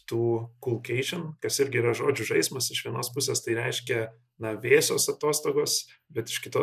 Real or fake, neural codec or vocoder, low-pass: real; none; 14.4 kHz